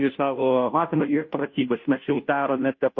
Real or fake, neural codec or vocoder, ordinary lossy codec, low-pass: fake; codec, 16 kHz, 0.5 kbps, FunCodec, trained on Chinese and English, 25 frames a second; MP3, 32 kbps; 7.2 kHz